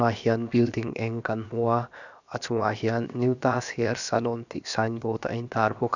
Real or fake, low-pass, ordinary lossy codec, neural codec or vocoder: fake; 7.2 kHz; none; codec, 16 kHz, about 1 kbps, DyCAST, with the encoder's durations